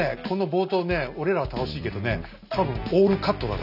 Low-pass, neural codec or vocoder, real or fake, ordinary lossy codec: 5.4 kHz; none; real; none